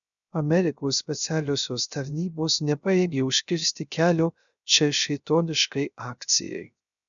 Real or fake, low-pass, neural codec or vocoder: fake; 7.2 kHz; codec, 16 kHz, 0.3 kbps, FocalCodec